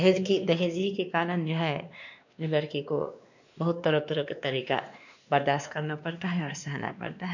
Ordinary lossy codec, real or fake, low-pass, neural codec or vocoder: none; fake; 7.2 kHz; codec, 16 kHz, 2 kbps, X-Codec, WavLM features, trained on Multilingual LibriSpeech